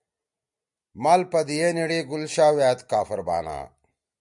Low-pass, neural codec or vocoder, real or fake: 10.8 kHz; none; real